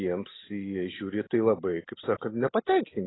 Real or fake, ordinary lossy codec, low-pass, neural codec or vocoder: real; AAC, 16 kbps; 7.2 kHz; none